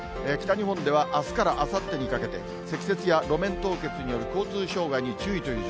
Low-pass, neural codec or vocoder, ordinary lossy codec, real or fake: none; none; none; real